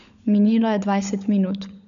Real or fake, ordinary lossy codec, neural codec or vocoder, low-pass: fake; none; codec, 16 kHz, 16 kbps, FunCodec, trained on LibriTTS, 50 frames a second; 7.2 kHz